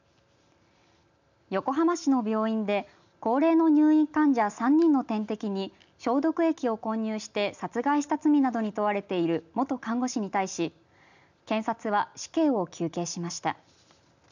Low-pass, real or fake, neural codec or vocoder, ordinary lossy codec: 7.2 kHz; real; none; none